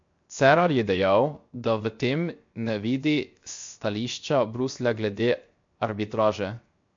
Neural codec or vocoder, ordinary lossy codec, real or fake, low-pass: codec, 16 kHz, 0.7 kbps, FocalCodec; MP3, 48 kbps; fake; 7.2 kHz